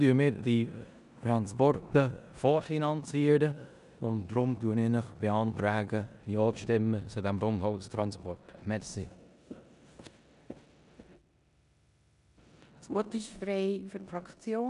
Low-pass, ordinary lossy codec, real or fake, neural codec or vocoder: 10.8 kHz; none; fake; codec, 16 kHz in and 24 kHz out, 0.9 kbps, LongCat-Audio-Codec, four codebook decoder